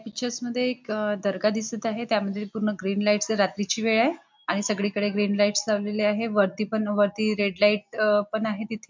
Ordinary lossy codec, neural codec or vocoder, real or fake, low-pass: MP3, 64 kbps; none; real; 7.2 kHz